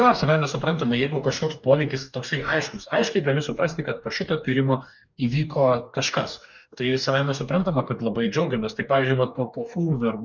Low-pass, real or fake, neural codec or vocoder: 7.2 kHz; fake; codec, 44.1 kHz, 2.6 kbps, DAC